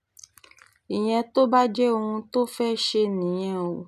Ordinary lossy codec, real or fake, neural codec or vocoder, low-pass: none; real; none; 14.4 kHz